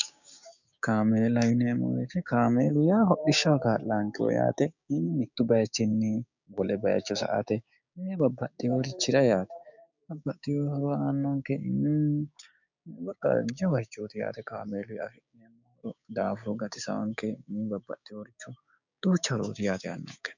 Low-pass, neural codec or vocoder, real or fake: 7.2 kHz; codec, 16 kHz, 6 kbps, DAC; fake